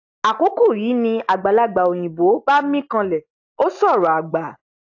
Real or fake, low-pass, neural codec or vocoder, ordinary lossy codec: real; 7.2 kHz; none; AAC, 48 kbps